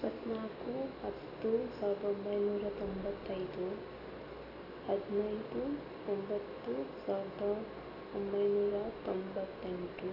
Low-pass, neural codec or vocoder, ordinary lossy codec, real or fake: 5.4 kHz; none; AAC, 24 kbps; real